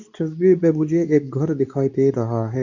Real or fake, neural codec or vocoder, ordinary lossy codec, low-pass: fake; codec, 24 kHz, 0.9 kbps, WavTokenizer, medium speech release version 2; none; 7.2 kHz